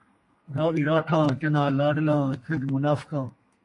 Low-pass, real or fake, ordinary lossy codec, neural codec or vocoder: 10.8 kHz; fake; MP3, 48 kbps; codec, 32 kHz, 1.9 kbps, SNAC